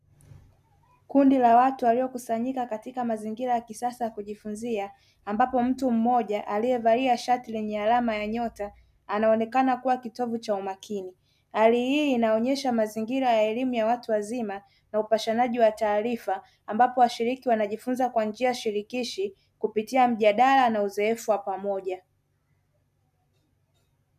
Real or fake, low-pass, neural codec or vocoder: real; 14.4 kHz; none